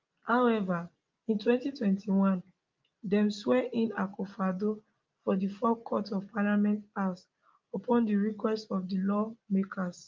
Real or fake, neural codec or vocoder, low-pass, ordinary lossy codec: real; none; 7.2 kHz; Opus, 24 kbps